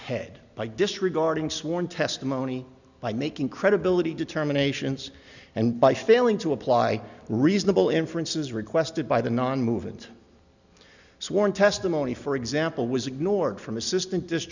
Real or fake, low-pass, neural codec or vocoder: real; 7.2 kHz; none